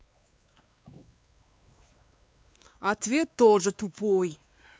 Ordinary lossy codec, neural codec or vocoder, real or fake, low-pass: none; codec, 16 kHz, 2 kbps, X-Codec, WavLM features, trained on Multilingual LibriSpeech; fake; none